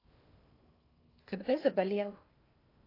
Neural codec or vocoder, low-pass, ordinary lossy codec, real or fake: codec, 16 kHz in and 24 kHz out, 0.6 kbps, FocalCodec, streaming, 2048 codes; 5.4 kHz; AAC, 24 kbps; fake